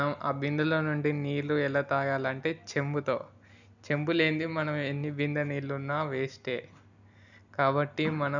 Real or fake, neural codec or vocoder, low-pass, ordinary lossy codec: real; none; 7.2 kHz; none